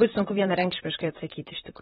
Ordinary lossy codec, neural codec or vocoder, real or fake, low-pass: AAC, 16 kbps; vocoder, 44.1 kHz, 128 mel bands, Pupu-Vocoder; fake; 19.8 kHz